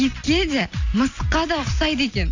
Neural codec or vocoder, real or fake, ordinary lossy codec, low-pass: none; real; AAC, 48 kbps; 7.2 kHz